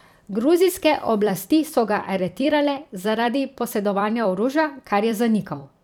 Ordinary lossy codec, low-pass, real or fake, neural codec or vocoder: none; 19.8 kHz; fake; vocoder, 44.1 kHz, 128 mel bands every 256 samples, BigVGAN v2